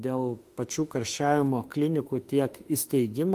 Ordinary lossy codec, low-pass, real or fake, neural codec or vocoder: Opus, 32 kbps; 14.4 kHz; fake; autoencoder, 48 kHz, 32 numbers a frame, DAC-VAE, trained on Japanese speech